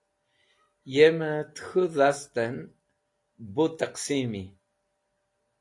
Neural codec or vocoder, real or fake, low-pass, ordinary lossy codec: none; real; 10.8 kHz; AAC, 48 kbps